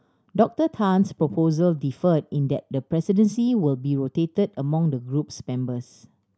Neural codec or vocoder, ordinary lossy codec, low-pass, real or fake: none; none; none; real